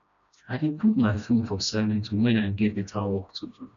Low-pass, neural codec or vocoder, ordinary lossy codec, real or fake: 7.2 kHz; codec, 16 kHz, 1 kbps, FreqCodec, smaller model; none; fake